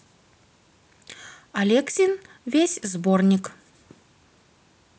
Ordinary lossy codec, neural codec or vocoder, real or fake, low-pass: none; none; real; none